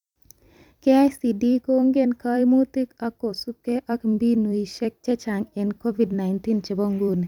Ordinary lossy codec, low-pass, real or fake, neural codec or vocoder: none; 19.8 kHz; fake; vocoder, 44.1 kHz, 128 mel bands every 256 samples, BigVGAN v2